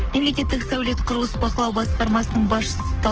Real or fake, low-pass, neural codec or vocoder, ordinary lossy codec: fake; 7.2 kHz; codec, 44.1 kHz, 7.8 kbps, Pupu-Codec; Opus, 16 kbps